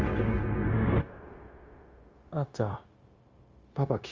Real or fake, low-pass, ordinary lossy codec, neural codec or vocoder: fake; 7.2 kHz; Opus, 32 kbps; codec, 16 kHz, 0.9 kbps, LongCat-Audio-Codec